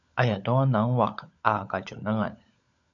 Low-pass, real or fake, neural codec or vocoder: 7.2 kHz; fake; codec, 16 kHz, 16 kbps, FunCodec, trained on LibriTTS, 50 frames a second